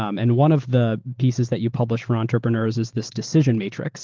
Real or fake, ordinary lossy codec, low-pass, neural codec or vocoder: real; Opus, 16 kbps; 7.2 kHz; none